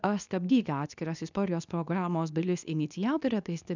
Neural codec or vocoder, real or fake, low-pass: codec, 24 kHz, 0.9 kbps, WavTokenizer, medium speech release version 2; fake; 7.2 kHz